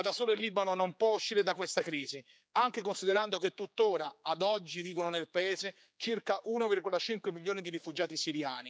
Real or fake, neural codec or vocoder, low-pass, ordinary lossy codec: fake; codec, 16 kHz, 4 kbps, X-Codec, HuBERT features, trained on general audio; none; none